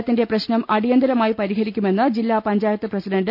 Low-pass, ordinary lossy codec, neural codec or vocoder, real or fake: 5.4 kHz; none; none; real